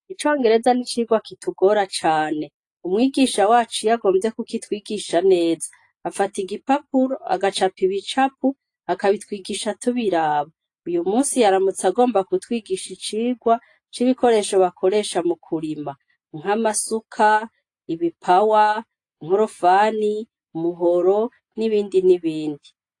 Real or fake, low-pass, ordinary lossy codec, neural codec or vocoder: real; 10.8 kHz; AAC, 48 kbps; none